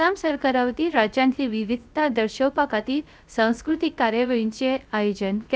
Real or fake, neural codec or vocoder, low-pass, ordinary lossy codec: fake; codec, 16 kHz, 0.3 kbps, FocalCodec; none; none